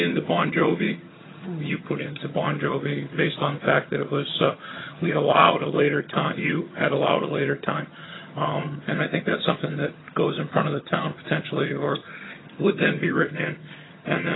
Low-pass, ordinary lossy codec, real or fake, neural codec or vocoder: 7.2 kHz; AAC, 16 kbps; fake; vocoder, 22.05 kHz, 80 mel bands, HiFi-GAN